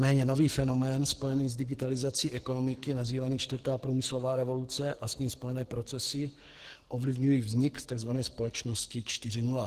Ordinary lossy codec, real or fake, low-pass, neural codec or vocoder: Opus, 16 kbps; fake; 14.4 kHz; codec, 44.1 kHz, 2.6 kbps, SNAC